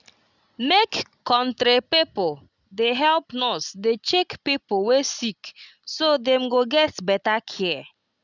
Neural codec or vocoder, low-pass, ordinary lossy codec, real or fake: none; 7.2 kHz; none; real